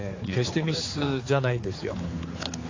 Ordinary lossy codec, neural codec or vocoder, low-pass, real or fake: AAC, 32 kbps; codec, 16 kHz, 4 kbps, X-Codec, HuBERT features, trained on general audio; 7.2 kHz; fake